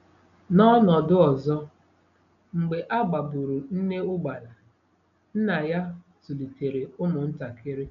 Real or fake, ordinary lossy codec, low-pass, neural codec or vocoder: real; none; 7.2 kHz; none